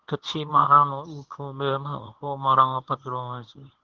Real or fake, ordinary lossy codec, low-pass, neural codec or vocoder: fake; Opus, 16 kbps; 7.2 kHz; codec, 24 kHz, 0.9 kbps, WavTokenizer, medium speech release version 1